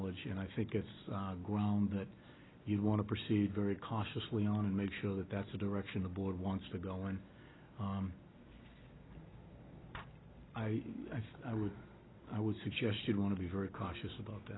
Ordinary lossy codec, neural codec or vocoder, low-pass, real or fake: AAC, 16 kbps; none; 7.2 kHz; real